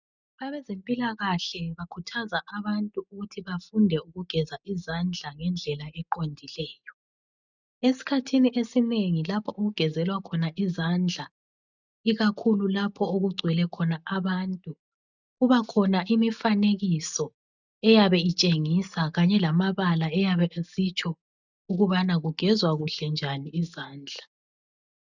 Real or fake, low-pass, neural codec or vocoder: real; 7.2 kHz; none